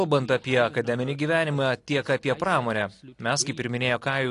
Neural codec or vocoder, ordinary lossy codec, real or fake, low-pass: none; AAC, 48 kbps; real; 10.8 kHz